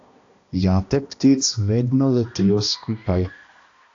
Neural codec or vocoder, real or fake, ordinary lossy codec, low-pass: codec, 16 kHz, 1 kbps, X-Codec, HuBERT features, trained on balanced general audio; fake; AAC, 48 kbps; 7.2 kHz